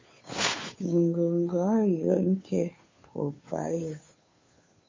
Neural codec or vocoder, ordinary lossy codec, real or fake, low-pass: codec, 16 kHz, 2 kbps, FunCodec, trained on Chinese and English, 25 frames a second; MP3, 32 kbps; fake; 7.2 kHz